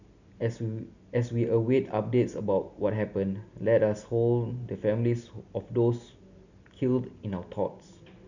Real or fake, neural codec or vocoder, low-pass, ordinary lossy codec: real; none; 7.2 kHz; none